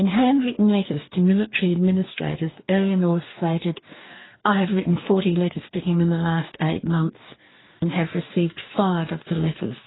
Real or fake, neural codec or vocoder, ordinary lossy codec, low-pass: fake; codec, 44.1 kHz, 2.6 kbps, DAC; AAC, 16 kbps; 7.2 kHz